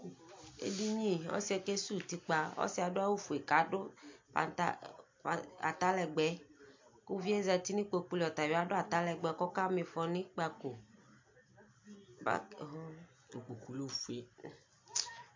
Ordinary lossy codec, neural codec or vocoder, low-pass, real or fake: MP3, 48 kbps; none; 7.2 kHz; real